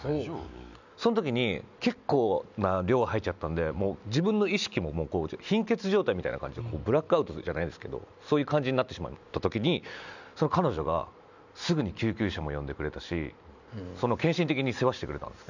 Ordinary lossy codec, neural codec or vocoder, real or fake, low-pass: none; none; real; 7.2 kHz